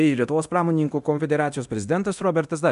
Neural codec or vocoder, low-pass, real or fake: codec, 24 kHz, 0.9 kbps, DualCodec; 10.8 kHz; fake